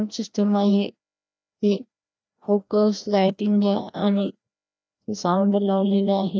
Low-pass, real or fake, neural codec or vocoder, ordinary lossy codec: none; fake; codec, 16 kHz, 1 kbps, FreqCodec, larger model; none